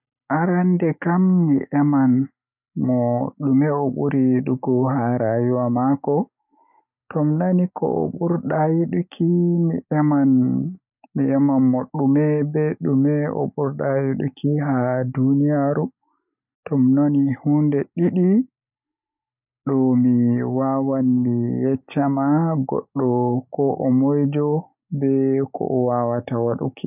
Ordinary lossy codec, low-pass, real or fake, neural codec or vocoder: none; 3.6 kHz; real; none